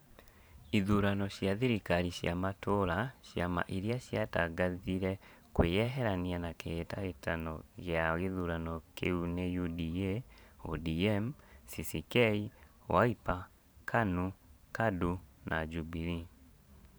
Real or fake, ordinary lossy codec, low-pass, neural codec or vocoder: fake; none; none; vocoder, 44.1 kHz, 128 mel bands every 512 samples, BigVGAN v2